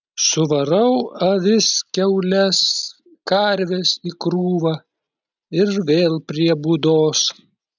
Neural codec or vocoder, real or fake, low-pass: none; real; 7.2 kHz